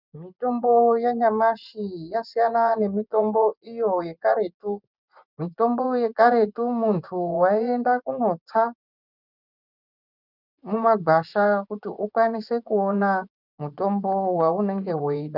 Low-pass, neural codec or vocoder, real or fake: 5.4 kHz; vocoder, 24 kHz, 100 mel bands, Vocos; fake